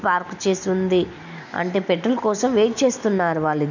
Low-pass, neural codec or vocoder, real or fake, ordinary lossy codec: 7.2 kHz; none; real; none